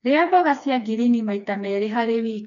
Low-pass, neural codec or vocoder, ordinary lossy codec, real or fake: 7.2 kHz; codec, 16 kHz, 2 kbps, FreqCodec, smaller model; none; fake